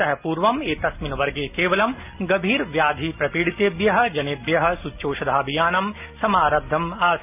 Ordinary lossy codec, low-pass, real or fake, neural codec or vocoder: none; 3.6 kHz; real; none